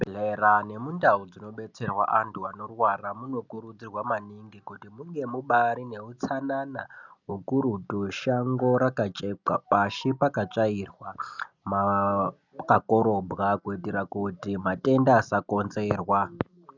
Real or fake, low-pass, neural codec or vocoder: real; 7.2 kHz; none